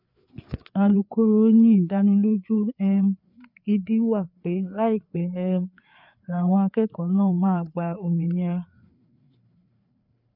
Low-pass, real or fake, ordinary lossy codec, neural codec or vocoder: 5.4 kHz; fake; none; codec, 16 kHz, 4 kbps, FreqCodec, larger model